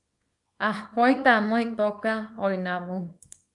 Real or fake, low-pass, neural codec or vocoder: fake; 10.8 kHz; codec, 24 kHz, 0.9 kbps, WavTokenizer, small release